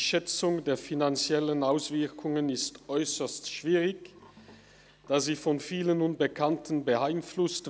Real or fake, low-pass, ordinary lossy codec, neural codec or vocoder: real; none; none; none